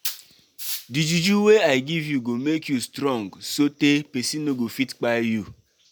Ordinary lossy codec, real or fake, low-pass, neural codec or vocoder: none; real; none; none